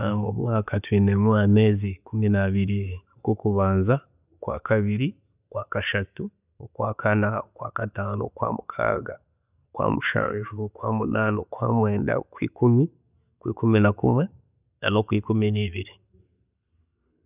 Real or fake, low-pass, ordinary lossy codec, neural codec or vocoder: real; 3.6 kHz; none; none